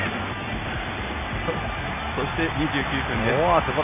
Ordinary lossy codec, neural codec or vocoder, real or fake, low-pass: none; none; real; 3.6 kHz